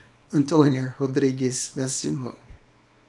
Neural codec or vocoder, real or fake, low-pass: codec, 24 kHz, 0.9 kbps, WavTokenizer, small release; fake; 10.8 kHz